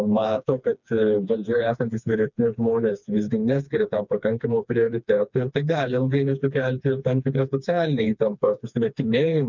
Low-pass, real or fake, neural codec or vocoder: 7.2 kHz; fake; codec, 16 kHz, 2 kbps, FreqCodec, smaller model